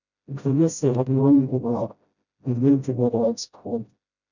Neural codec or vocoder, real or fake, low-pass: codec, 16 kHz, 0.5 kbps, FreqCodec, smaller model; fake; 7.2 kHz